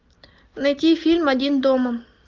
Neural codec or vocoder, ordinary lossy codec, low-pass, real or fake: none; Opus, 32 kbps; 7.2 kHz; real